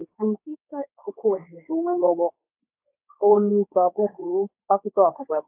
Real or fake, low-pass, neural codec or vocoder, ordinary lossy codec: fake; 3.6 kHz; codec, 24 kHz, 0.9 kbps, WavTokenizer, medium speech release version 2; AAC, 24 kbps